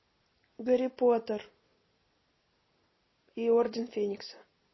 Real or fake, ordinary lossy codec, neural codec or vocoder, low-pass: real; MP3, 24 kbps; none; 7.2 kHz